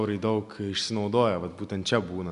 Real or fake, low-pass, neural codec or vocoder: real; 10.8 kHz; none